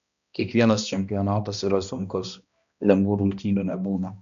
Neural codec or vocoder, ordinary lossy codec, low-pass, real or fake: codec, 16 kHz, 1 kbps, X-Codec, HuBERT features, trained on balanced general audio; MP3, 96 kbps; 7.2 kHz; fake